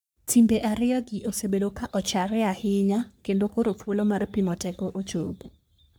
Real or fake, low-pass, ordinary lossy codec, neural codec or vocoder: fake; none; none; codec, 44.1 kHz, 3.4 kbps, Pupu-Codec